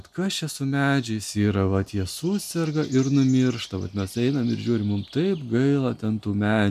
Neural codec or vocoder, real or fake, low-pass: none; real; 14.4 kHz